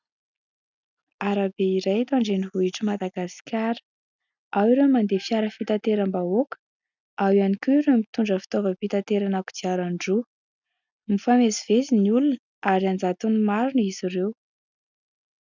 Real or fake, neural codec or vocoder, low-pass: real; none; 7.2 kHz